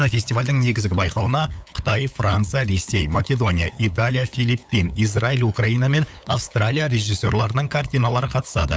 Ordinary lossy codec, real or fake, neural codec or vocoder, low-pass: none; fake; codec, 16 kHz, 8 kbps, FunCodec, trained on LibriTTS, 25 frames a second; none